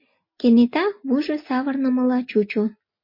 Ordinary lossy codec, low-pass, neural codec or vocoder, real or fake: MP3, 32 kbps; 5.4 kHz; none; real